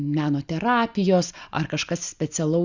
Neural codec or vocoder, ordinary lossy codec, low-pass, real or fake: none; Opus, 64 kbps; 7.2 kHz; real